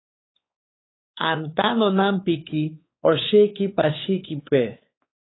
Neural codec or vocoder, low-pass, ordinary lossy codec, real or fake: codec, 16 kHz, 4 kbps, X-Codec, WavLM features, trained on Multilingual LibriSpeech; 7.2 kHz; AAC, 16 kbps; fake